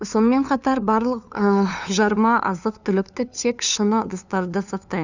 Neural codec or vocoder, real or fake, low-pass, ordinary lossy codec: codec, 16 kHz, 2 kbps, FunCodec, trained on LibriTTS, 25 frames a second; fake; 7.2 kHz; none